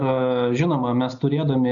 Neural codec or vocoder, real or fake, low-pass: none; real; 7.2 kHz